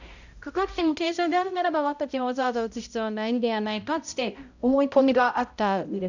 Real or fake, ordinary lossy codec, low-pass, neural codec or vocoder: fake; none; 7.2 kHz; codec, 16 kHz, 0.5 kbps, X-Codec, HuBERT features, trained on balanced general audio